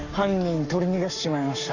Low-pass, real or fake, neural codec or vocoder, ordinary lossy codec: 7.2 kHz; fake; codec, 44.1 kHz, 7.8 kbps, Pupu-Codec; none